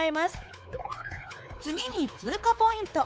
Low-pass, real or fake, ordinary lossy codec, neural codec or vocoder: none; fake; none; codec, 16 kHz, 4 kbps, X-Codec, WavLM features, trained on Multilingual LibriSpeech